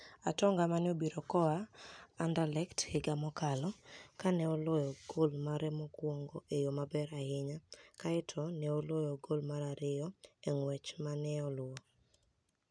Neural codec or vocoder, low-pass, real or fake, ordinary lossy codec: none; 9.9 kHz; real; none